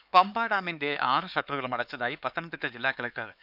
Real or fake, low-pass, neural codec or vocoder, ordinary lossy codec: fake; 5.4 kHz; codec, 16 kHz, 4 kbps, X-Codec, HuBERT features, trained on LibriSpeech; none